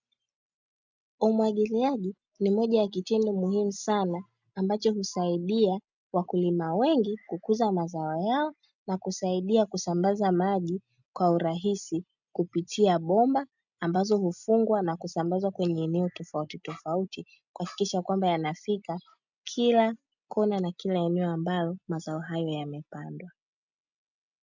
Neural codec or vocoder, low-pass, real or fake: none; 7.2 kHz; real